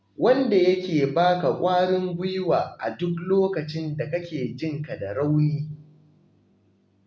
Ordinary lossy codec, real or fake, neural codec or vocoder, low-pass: none; real; none; none